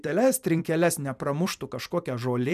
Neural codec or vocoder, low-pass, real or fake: vocoder, 44.1 kHz, 128 mel bands every 256 samples, BigVGAN v2; 14.4 kHz; fake